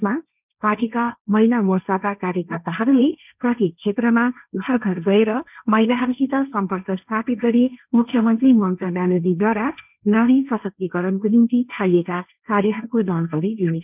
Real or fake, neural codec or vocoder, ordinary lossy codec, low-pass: fake; codec, 16 kHz, 1.1 kbps, Voila-Tokenizer; none; 3.6 kHz